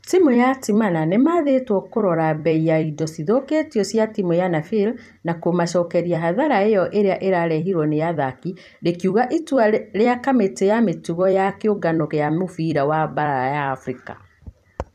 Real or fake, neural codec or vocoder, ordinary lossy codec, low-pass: fake; vocoder, 44.1 kHz, 128 mel bands every 256 samples, BigVGAN v2; none; 14.4 kHz